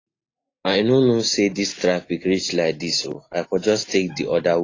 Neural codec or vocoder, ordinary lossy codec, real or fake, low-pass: none; AAC, 32 kbps; real; 7.2 kHz